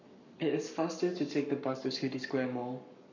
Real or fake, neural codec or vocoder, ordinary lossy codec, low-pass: fake; codec, 44.1 kHz, 7.8 kbps, Pupu-Codec; none; 7.2 kHz